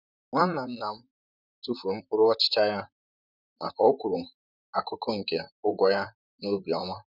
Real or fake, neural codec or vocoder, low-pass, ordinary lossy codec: fake; vocoder, 44.1 kHz, 128 mel bands, Pupu-Vocoder; 5.4 kHz; none